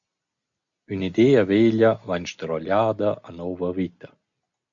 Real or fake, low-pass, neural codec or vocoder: real; 7.2 kHz; none